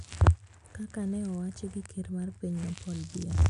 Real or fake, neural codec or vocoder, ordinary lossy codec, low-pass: real; none; none; 10.8 kHz